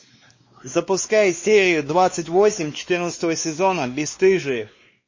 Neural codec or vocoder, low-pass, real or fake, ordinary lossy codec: codec, 16 kHz, 2 kbps, X-Codec, WavLM features, trained on Multilingual LibriSpeech; 7.2 kHz; fake; MP3, 32 kbps